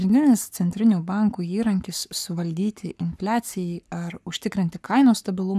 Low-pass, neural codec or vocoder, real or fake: 14.4 kHz; codec, 44.1 kHz, 7.8 kbps, Pupu-Codec; fake